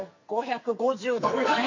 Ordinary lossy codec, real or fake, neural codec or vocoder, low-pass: MP3, 48 kbps; fake; codec, 44.1 kHz, 2.6 kbps, SNAC; 7.2 kHz